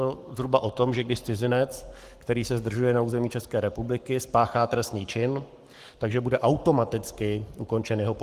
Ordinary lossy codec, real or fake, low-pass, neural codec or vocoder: Opus, 24 kbps; fake; 14.4 kHz; codec, 44.1 kHz, 7.8 kbps, Pupu-Codec